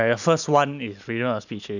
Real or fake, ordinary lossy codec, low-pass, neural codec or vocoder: real; none; 7.2 kHz; none